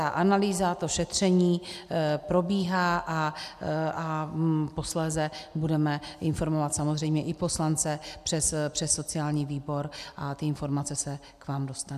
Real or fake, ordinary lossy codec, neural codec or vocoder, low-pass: real; AAC, 96 kbps; none; 14.4 kHz